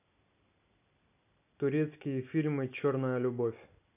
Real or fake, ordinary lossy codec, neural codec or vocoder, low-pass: real; none; none; 3.6 kHz